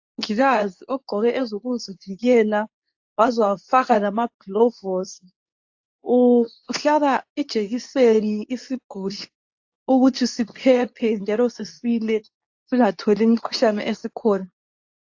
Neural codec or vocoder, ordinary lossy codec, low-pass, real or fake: codec, 24 kHz, 0.9 kbps, WavTokenizer, medium speech release version 2; AAC, 48 kbps; 7.2 kHz; fake